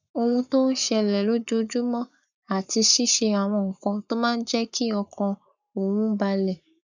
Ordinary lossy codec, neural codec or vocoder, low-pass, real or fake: none; codec, 44.1 kHz, 7.8 kbps, Pupu-Codec; 7.2 kHz; fake